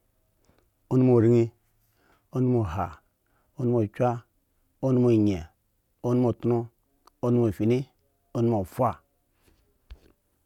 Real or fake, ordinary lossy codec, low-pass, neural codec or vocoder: real; none; 19.8 kHz; none